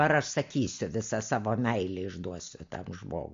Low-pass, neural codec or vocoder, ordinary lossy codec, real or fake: 7.2 kHz; none; MP3, 48 kbps; real